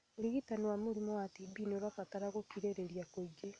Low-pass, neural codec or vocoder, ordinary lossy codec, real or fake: 9.9 kHz; none; none; real